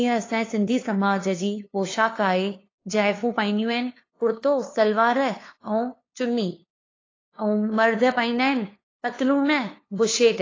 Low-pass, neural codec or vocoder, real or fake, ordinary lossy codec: 7.2 kHz; codec, 16 kHz, 2 kbps, FunCodec, trained on LibriTTS, 25 frames a second; fake; AAC, 32 kbps